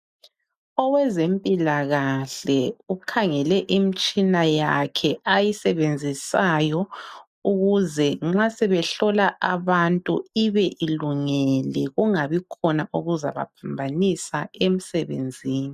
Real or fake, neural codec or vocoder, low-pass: real; none; 14.4 kHz